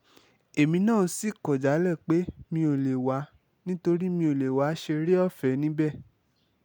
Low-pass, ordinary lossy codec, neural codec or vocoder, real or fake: none; none; none; real